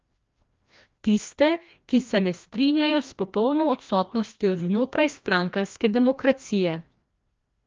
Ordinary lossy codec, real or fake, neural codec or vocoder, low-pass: Opus, 24 kbps; fake; codec, 16 kHz, 1 kbps, FreqCodec, larger model; 7.2 kHz